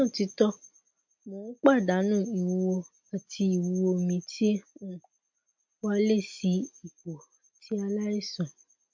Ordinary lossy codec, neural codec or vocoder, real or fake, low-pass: MP3, 48 kbps; none; real; 7.2 kHz